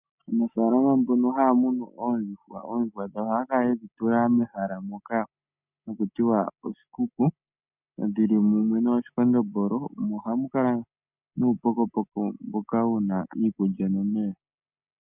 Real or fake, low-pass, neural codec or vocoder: real; 3.6 kHz; none